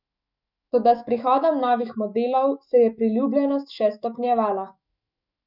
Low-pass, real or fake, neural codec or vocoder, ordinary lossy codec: 5.4 kHz; fake; autoencoder, 48 kHz, 128 numbers a frame, DAC-VAE, trained on Japanese speech; none